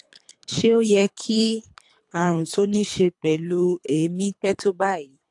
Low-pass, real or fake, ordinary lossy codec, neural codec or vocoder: 10.8 kHz; fake; AAC, 64 kbps; codec, 24 kHz, 3 kbps, HILCodec